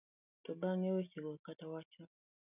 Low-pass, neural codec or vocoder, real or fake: 3.6 kHz; none; real